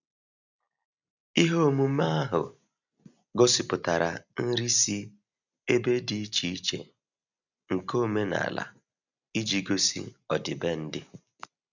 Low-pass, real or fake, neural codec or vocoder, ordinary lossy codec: 7.2 kHz; real; none; none